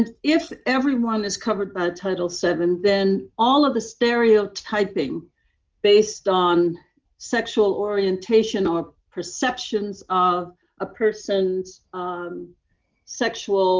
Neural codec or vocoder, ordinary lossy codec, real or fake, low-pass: none; Opus, 24 kbps; real; 7.2 kHz